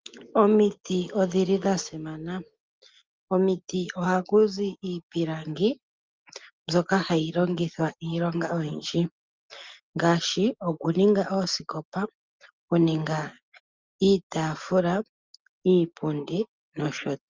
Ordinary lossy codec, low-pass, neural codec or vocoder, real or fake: Opus, 32 kbps; 7.2 kHz; none; real